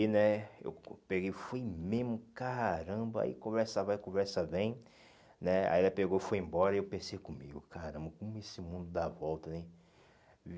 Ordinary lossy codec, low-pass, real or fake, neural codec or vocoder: none; none; real; none